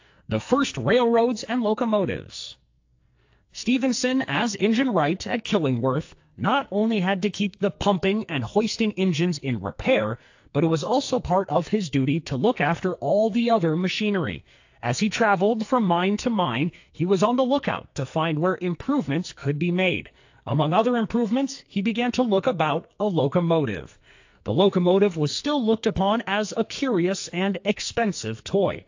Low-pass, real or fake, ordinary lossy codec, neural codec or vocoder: 7.2 kHz; fake; AAC, 48 kbps; codec, 44.1 kHz, 2.6 kbps, SNAC